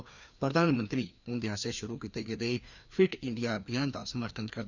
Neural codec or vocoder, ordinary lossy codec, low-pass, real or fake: codec, 16 kHz, 2 kbps, FreqCodec, larger model; none; 7.2 kHz; fake